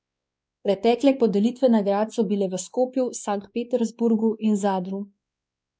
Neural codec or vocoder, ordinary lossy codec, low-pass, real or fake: codec, 16 kHz, 2 kbps, X-Codec, WavLM features, trained on Multilingual LibriSpeech; none; none; fake